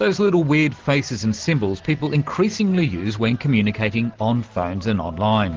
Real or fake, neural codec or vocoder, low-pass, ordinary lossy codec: real; none; 7.2 kHz; Opus, 16 kbps